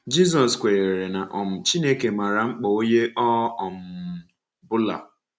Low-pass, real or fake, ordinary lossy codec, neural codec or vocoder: none; real; none; none